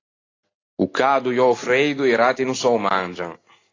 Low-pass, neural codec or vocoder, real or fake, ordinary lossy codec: 7.2 kHz; none; real; AAC, 32 kbps